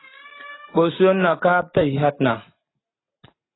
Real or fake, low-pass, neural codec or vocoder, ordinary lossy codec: real; 7.2 kHz; none; AAC, 16 kbps